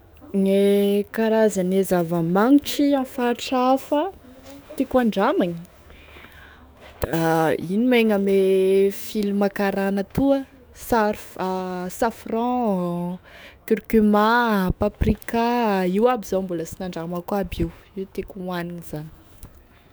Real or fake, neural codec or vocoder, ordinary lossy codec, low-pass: fake; autoencoder, 48 kHz, 128 numbers a frame, DAC-VAE, trained on Japanese speech; none; none